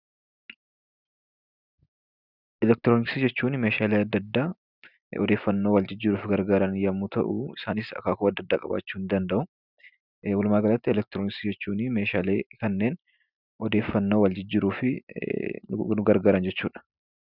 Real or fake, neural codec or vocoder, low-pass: real; none; 5.4 kHz